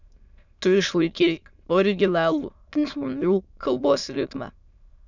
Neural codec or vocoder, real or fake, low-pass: autoencoder, 22.05 kHz, a latent of 192 numbers a frame, VITS, trained on many speakers; fake; 7.2 kHz